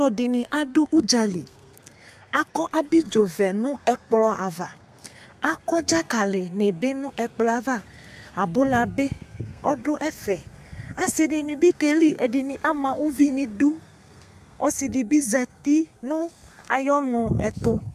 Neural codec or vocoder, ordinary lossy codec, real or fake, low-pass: codec, 32 kHz, 1.9 kbps, SNAC; AAC, 96 kbps; fake; 14.4 kHz